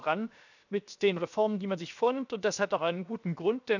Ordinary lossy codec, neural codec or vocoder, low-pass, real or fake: none; codec, 16 kHz, 0.7 kbps, FocalCodec; 7.2 kHz; fake